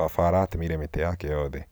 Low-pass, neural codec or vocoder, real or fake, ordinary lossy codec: none; none; real; none